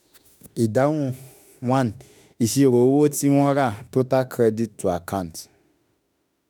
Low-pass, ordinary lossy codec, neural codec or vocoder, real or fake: none; none; autoencoder, 48 kHz, 32 numbers a frame, DAC-VAE, trained on Japanese speech; fake